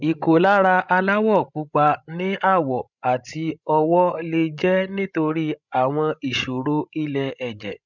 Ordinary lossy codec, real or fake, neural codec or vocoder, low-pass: none; fake; codec, 16 kHz, 16 kbps, FreqCodec, larger model; 7.2 kHz